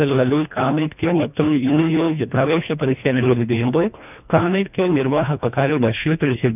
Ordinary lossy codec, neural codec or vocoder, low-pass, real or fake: none; codec, 24 kHz, 1.5 kbps, HILCodec; 3.6 kHz; fake